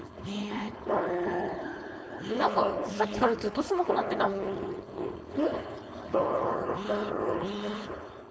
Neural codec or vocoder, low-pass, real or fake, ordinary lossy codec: codec, 16 kHz, 4.8 kbps, FACodec; none; fake; none